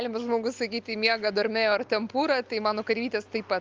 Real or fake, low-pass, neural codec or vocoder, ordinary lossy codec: real; 7.2 kHz; none; Opus, 24 kbps